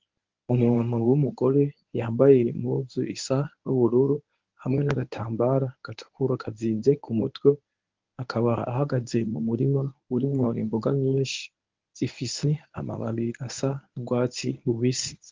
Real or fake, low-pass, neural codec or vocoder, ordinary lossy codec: fake; 7.2 kHz; codec, 24 kHz, 0.9 kbps, WavTokenizer, medium speech release version 1; Opus, 32 kbps